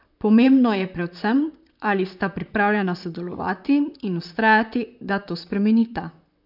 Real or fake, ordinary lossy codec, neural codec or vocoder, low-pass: fake; none; vocoder, 44.1 kHz, 128 mel bands, Pupu-Vocoder; 5.4 kHz